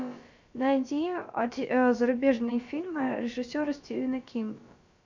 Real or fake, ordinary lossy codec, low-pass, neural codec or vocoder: fake; MP3, 64 kbps; 7.2 kHz; codec, 16 kHz, about 1 kbps, DyCAST, with the encoder's durations